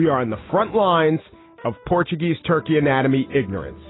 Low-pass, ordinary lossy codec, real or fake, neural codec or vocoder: 7.2 kHz; AAC, 16 kbps; real; none